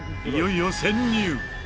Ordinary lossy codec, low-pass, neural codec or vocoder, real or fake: none; none; none; real